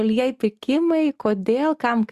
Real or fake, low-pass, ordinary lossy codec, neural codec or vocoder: real; 14.4 kHz; Opus, 64 kbps; none